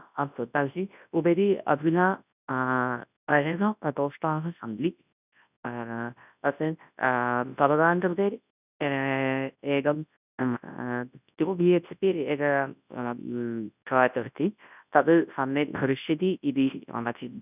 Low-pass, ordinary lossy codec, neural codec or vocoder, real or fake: 3.6 kHz; none; codec, 24 kHz, 0.9 kbps, WavTokenizer, large speech release; fake